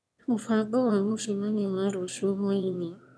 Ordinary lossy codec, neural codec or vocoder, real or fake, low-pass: none; autoencoder, 22.05 kHz, a latent of 192 numbers a frame, VITS, trained on one speaker; fake; none